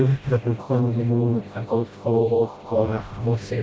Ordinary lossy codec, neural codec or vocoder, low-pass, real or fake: none; codec, 16 kHz, 0.5 kbps, FreqCodec, smaller model; none; fake